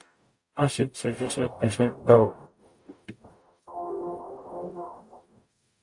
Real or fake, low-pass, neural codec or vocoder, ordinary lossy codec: fake; 10.8 kHz; codec, 44.1 kHz, 0.9 kbps, DAC; AAC, 64 kbps